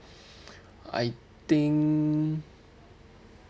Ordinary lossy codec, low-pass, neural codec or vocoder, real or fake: none; none; none; real